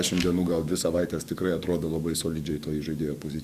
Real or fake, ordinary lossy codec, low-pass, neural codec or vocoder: fake; Opus, 64 kbps; 14.4 kHz; codec, 44.1 kHz, 7.8 kbps, DAC